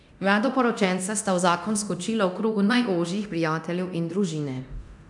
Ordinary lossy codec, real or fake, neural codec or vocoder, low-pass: none; fake; codec, 24 kHz, 0.9 kbps, DualCodec; 10.8 kHz